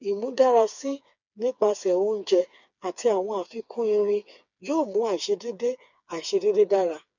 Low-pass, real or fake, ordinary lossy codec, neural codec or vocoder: 7.2 kHz; fake; none; codec, 16 kHz, 4 kbps, FreqCodec, smaller model